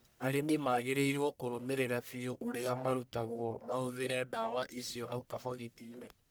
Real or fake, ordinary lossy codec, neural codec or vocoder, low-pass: fake; none; codec, 44.1 kHz, 1.7 kbps, Pupu-Codec; none